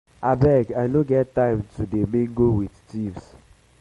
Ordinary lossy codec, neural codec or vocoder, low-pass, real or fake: MP3, 48 kbps; none; 19.8 kHz; real